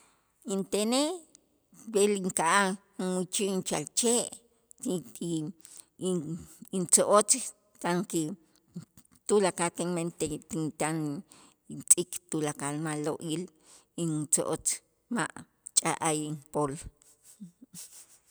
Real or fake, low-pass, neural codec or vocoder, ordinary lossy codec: real; none; none; none